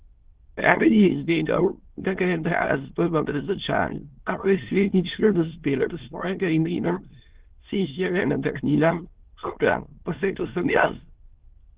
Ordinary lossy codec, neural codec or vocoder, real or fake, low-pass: Opus, 16 kbps; autoencoder, 22.05 kHz, a latent of 192 numbers a frame, VITS, trained on many speakers; fake; 3.6 kHz